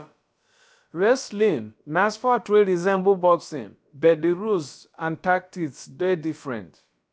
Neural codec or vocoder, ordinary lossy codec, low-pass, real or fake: codec, 16 kHz, about 1 kbps, DyCAST, with the encoder's durations; none; none; fake